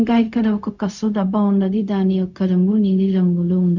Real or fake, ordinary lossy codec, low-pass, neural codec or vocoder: fake; none; 7.2 kHz; codec, 16 kHz, 0.4 kbps, LongCat-Audio-Codec